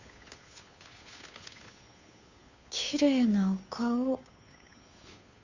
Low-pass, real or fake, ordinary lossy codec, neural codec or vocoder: 7.2 kHz; fake; Opus, 64 kbps; codec, 16 kHz, 2 kbps, FunCodec, trained on Chinese and English, 25 frames a second